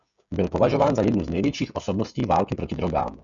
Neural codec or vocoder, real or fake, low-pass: codec, 16 kHz, 16 kbps, FreqCodec, smaller model; fake; 7.2 kHz